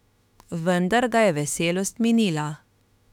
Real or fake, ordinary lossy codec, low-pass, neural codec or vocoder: fake; none; 19.8 kHz; autoencoder, 48 kHz, 32 numbers a frame, DAC-VAE, trained on Japanese speech